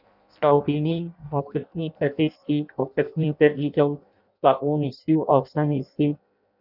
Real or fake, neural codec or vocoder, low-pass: fake; codec, 16 kHz in and 24 kHz out, 0.6 kbps, FireRedTTS-2 codec; 5.4 kHz